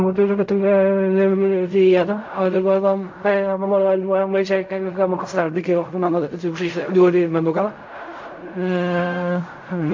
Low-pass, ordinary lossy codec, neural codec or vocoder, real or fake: 7.2 kHz; MP3, 48 kbps; codec, 16 kHz in and 24 kHz out, 0.4 kbps, LongCat-Audio-Codec, fine tuned four codebook decoder; fake